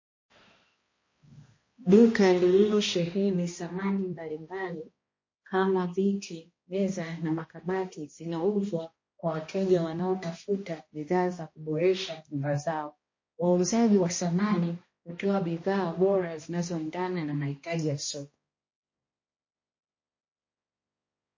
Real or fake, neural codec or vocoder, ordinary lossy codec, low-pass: fake; codec, 16 kHz, 1 kbps, X-Codec, HuBERT features, trained on balanced general audio; MP3, 32 kbps; 7.2 kHz